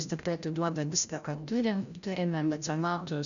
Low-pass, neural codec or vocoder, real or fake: 7.2 kHz; codec, 16 kHz, 0.5 kbps, FreqCodec, larger model; fake